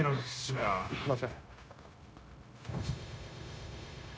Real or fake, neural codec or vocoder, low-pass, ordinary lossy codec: fake; codec, 16 kHz, 0.9 kbps, LongCat-Audio-Codec; none; none